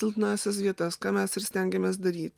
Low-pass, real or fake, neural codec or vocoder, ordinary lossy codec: 14.4 kHz; real; none; Opus, 32 kbps